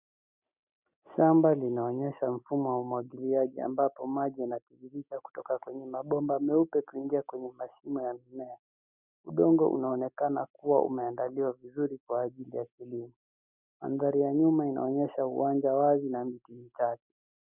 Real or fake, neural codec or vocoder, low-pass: real; none; 3.6 kHz